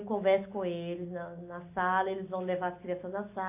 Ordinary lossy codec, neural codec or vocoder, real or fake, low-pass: AAC, 24 kbps; none; real; 3.6 kHz